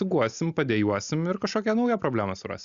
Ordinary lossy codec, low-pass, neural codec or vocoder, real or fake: Opus, 64 kbps; 7.2 kHz; none; real